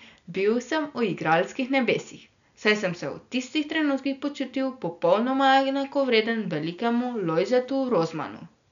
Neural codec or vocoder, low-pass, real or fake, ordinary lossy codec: none; 7.2 kHz; real; none